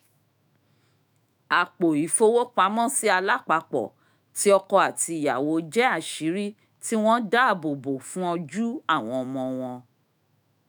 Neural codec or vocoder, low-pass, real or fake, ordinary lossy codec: autoencoder, 48 kHz, 128 numbers a frame, DAC-VAE, trained on Japanese speech; none; fake; none